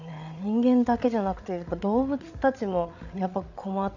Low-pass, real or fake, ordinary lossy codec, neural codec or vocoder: 7.2 kHz; fake; none; codec, 16 kHz, 8 kbps, FreqCodec, larger model